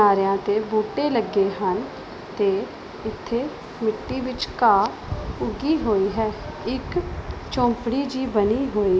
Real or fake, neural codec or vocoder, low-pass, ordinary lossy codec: real; none; none; none